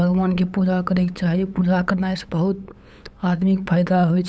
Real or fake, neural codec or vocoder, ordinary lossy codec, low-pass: fake; codec, 16 kHz, 8 kbps, FunCodec, trained on LibriTTS, 25 frames a second; none; none